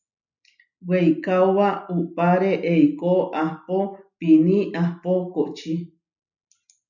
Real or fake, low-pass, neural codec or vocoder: real; 7.2 kHz; none